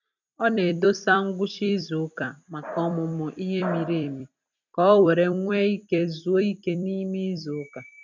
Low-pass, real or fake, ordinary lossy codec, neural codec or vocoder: 7.2 kHz; fake; none; vocoder, 44.1 kHz, 128 mel bands every 256 samples, BigVGAN v2